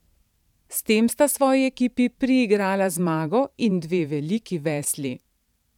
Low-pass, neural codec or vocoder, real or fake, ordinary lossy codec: 19.8 kHz; vocoder, 44.1 kHz, 128 mel bands every 512 samples, BigVGAN v2; fake; none